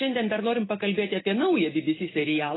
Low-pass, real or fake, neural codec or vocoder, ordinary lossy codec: 7.2 kHz; real; none; AAC, 16 kbps